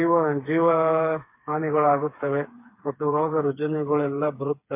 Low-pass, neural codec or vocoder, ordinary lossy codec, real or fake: 3.6 kHz; codec, 16 kHz, 4 kbps, FreqCodec, smaller model; MP3, 24 kbps; fake